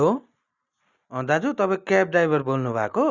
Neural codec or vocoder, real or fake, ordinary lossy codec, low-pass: none; real; Opus, 64 kbps; 7.2 kHz